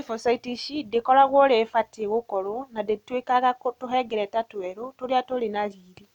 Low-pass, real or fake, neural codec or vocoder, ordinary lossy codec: 19.8 kHz; real; none; none